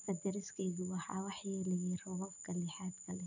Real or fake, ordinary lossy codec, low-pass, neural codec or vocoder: real; none; 7.2 kHz; none